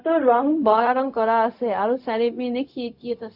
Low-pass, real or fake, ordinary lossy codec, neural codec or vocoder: 5.4 kHz; fake; none; codec, 16 kHz, 0.4 kbps, LongCat-Audio-Codec